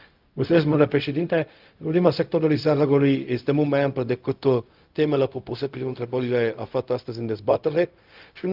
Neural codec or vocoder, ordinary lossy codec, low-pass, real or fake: codec, 16 kHz, 0.4 kbps, LongCat-Audio-Codec; Opus, 32 kbps; 5.4 kHz; fake